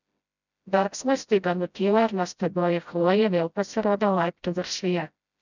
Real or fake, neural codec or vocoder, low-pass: fake; codec, 16 kHz, 0.5 kbps, FreqCodec, smaller model; 7.2 kHz